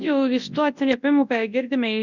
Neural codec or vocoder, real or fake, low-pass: codec, 24 kHz, 0.9 kbps, WavTokenizer, large speech release; fake; 7.2 kHz